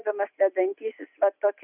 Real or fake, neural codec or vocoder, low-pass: real; none; 3.6 kHz